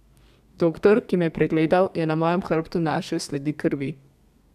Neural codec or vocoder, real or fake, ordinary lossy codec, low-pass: codec, 32 kHz, 1.9 kbps, SNAC; fake; none; 14.4 kHz